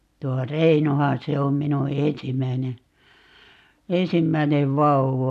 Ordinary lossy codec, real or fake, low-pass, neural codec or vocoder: none; real; 14.4 kHz; none